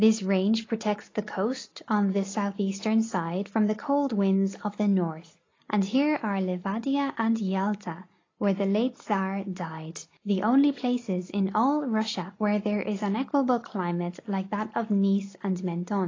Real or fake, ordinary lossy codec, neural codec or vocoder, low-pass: real; AAC, 32 kbps; none; 7.2 kHz